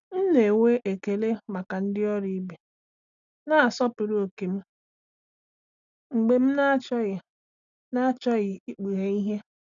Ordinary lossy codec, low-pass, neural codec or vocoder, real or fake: none; 7.2 kHz; none; real